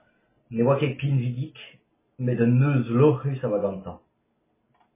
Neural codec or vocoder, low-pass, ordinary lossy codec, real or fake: none; 3.6 kHz; MP3, 16 kbps; real